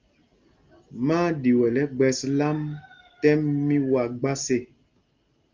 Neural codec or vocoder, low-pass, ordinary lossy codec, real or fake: none; 7.2 kHz; Opus, 32 kbps; real